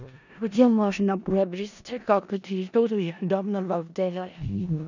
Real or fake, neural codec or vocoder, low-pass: fake; codec, 16 kHz in and 24 kHz out, 0.4 kbps, LongCat-Audio-Codec, four codebook decoder; 7.2 kHz